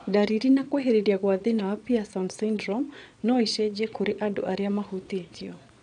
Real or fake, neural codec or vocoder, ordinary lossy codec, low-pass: fake; vocoder, 22.05 kHz, 80 mel bands, Vocos; none; 9.9 kHz